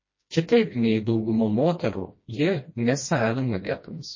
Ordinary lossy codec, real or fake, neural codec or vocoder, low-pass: MP3, 32 kbps; fake; codec, 16 kHz, 1 kbps, FreqCodec, smaller model; 7.2 kHz